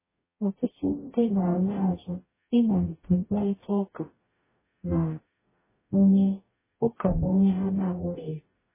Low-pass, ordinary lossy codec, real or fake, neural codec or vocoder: 3.6 kHz; MP3, 16 kbps; fake; codec, 44.1 kHz, 0.9 kbps, DAC